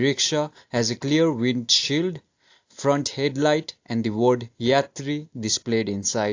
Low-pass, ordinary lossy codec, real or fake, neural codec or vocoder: 7.2 kHz; AAC, 48 kbps; real; none